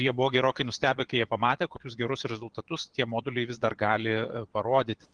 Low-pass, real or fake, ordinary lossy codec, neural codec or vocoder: 9.9 kHz; fake; Opus, 16 kbps; vocoder, 22.05 kHz, 80 mel bands, Vocos